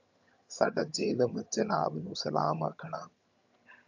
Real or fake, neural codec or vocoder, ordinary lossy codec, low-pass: fake; vocoder, 22.05 kHz, 80 mel bands, HiFi-GAN; AAC, 48 kbps; 7.2 kHz